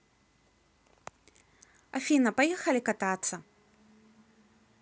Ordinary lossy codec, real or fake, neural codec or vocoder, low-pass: none; real; none; none